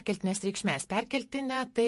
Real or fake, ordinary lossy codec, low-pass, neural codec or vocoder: fake; MP3, 48 kbps; 14.4 kHz; vocoder, 48 kHz, 128 mel bands, Vocos